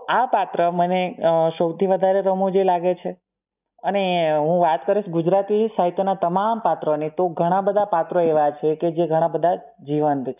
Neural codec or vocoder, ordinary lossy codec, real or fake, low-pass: none; none; real; 3.6 kHz